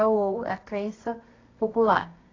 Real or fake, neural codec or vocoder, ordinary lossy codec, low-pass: fake; codec, 24 kHz, 0.9 kbps, WavTokenizer, medium music audio release; AAC, 32 kbps; 7.2 kHz